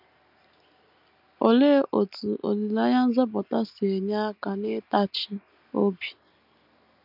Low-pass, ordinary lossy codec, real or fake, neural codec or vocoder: 5.4 kHz; none; real; none